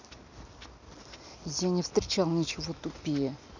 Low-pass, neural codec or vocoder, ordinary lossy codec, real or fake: 7.2 kHz; none; none; real